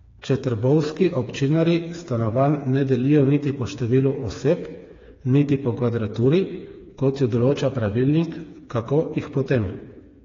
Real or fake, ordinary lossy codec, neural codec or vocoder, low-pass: fake; AAC, 32 kbps; codec, 16 kHz, 4 kbps, FreqCodec, smaller model; 7.2 kHz